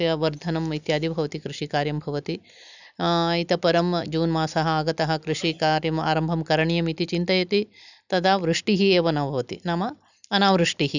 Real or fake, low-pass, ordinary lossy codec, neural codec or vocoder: real; 7.2 kHz; none; none